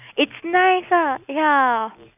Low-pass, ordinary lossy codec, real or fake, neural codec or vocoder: 3.6 kHz; none; real; none